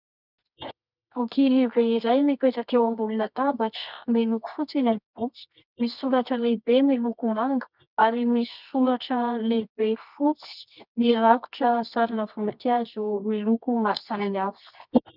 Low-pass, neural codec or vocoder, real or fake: 5.4 kHz; codec, 24 kHz, 0.9 kbps, WavTokenizer, medium music audio release; fake